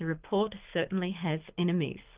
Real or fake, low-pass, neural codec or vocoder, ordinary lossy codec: fake; 3.6 kHz; codec, 24 kHz, 6 kbps, HILCodec; Opus, 24 kbps